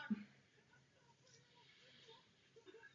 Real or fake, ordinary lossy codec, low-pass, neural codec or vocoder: real; AAC, 32 kbps; 7.2 kHz; none